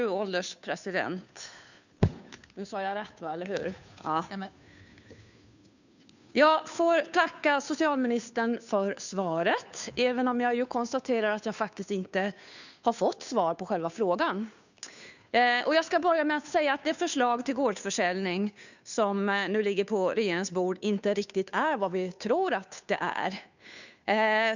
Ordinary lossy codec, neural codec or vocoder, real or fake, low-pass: none; codec, 16 kHz, 2 kbps, FunCodec, trained on Chinese and English, 25 frames a second; fake; 7.2 kHz